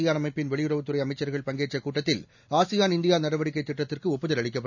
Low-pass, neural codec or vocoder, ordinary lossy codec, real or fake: 7.2 kHz; none; none; real